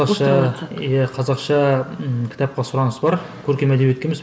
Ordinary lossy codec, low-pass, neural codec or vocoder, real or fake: none; none; none; real